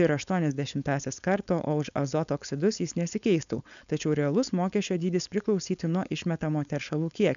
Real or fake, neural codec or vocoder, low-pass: fake; codec, 16 kHz, 4.8 kbps, FACodec; 7.2 kHz